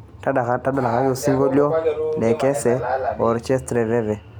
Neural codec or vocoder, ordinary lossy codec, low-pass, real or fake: none; none; none; real